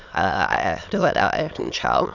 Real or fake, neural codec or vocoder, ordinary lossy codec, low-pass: fake; autoencoder, 22.05 kHz, a latent of 192 numbers a frame, VITS, trained on many speakers; none; 7.2 kHz